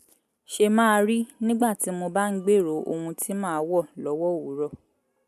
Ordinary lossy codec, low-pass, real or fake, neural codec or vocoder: none; 14.4 kHz; real; none